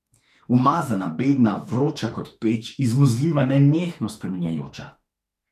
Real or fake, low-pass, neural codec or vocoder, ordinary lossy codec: fake; 14.4 kHz; autoencoder, 48 kHz, 32 numbers a frame, DAC-VAE, trained on Japanese speech; none